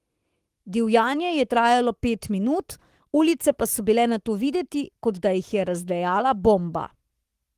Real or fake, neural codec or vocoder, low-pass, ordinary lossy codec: fake; codec, 44.1 kHz, 7.8 kbps, Pupu-Codec; 14.4 kHz; Opus, 24 kbps